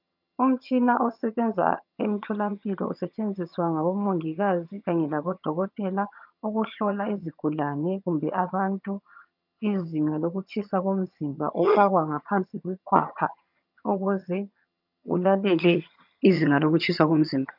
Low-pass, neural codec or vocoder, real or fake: 5.4 kHz; vocoder, 22.05 kHz, 80 mel bands, HiFi-GAN; fake